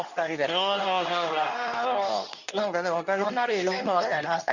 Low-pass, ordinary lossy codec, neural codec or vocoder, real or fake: 7.2 kHz; none; codec, 24 kHz, 0.9 kbps, WavTokenizer, medium speech release version 2; fake